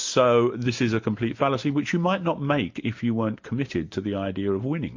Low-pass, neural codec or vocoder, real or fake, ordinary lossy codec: 7.2 kHz; none; real; MP3, 48 kbps